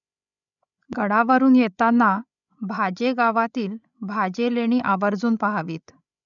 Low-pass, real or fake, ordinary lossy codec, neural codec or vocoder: 7.2 kHz; fake; none; codec, 16 kHz, 16 kbps, FreqCodec, larger model